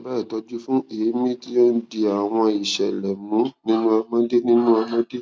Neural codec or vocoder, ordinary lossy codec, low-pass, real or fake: none; none; none; real